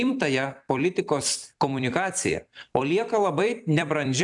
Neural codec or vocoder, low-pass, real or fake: vocoder, 44.1 kHz, 128 mel bands every 256 samples, BigVGAN v2; 10.8 kHz; fake